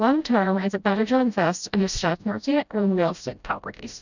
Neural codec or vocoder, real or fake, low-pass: codec, 16 kHz, 0.5 kbps, FreqCodec, smaller model; fake; 7.2 kHz